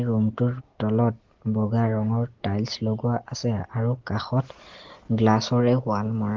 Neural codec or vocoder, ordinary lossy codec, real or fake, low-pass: none; Opus, 16 kbps; real; 7.2 kHz